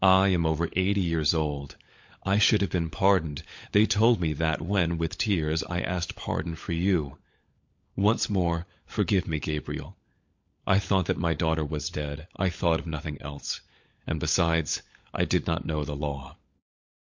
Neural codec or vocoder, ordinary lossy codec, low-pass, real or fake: codec, 16 kHz, 16 kbps, FunCodec, trained on LibriTTS, 50 frames a second; MP3, 48 kbps; 7.2 kHz; fake